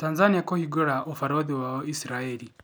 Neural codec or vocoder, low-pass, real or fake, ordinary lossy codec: none; none; real; none